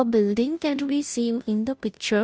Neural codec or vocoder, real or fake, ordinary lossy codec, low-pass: codec, 16 kHz, 0.5 kbps, FunCodec, trained on Chinese and English, 25 frames a second; fake; none; none